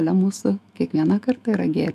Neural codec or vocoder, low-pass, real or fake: autoencoder, 48 kHz, 128 numbers a frame, DAC-VAE, trained on Japanese speech; 14.4 kHz; fake